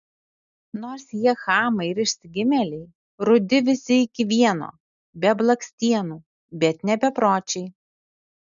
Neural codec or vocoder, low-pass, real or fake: none; 7.2 kHz; real